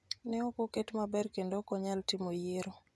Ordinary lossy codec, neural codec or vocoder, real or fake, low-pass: none; vocoder, 24 kHz, 100 mel bands, Vocos; fake; none